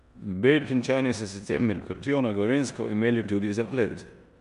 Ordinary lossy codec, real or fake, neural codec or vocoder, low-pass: none; fake; codec, 16 kHz in and 24 kHz out, 0.9 kbps, LongCat-Audio-Codec, four codebook decoder; 10.8 kHz